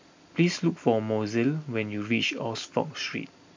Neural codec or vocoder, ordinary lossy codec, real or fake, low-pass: none; MP3, 64 kbps; real; 7.2 kHz